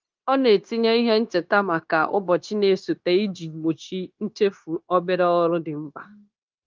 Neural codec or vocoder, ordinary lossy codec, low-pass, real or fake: codec, 16 kHz, 0.9 kbps, LongCat-Audio-Codec; Opus, 32 kbps; 7.2 kHz; fake